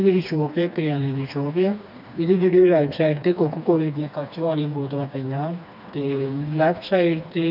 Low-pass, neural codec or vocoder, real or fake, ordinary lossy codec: 5.4 kHz; codec, 16 kHz, 2 kbps, FreqCodec, smaller model; fake; none